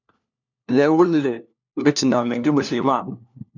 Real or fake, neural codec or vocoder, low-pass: fake; codec, 16 kHz, 1 kbps, FunCodec, trained on LibriTTS, 50 frames a second; 7.2 kHz